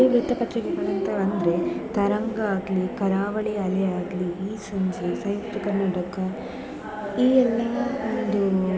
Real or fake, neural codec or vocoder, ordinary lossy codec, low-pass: real; none; none; none